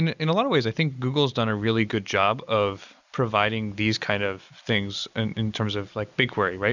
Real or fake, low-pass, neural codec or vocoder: real; 7.2 kHz; none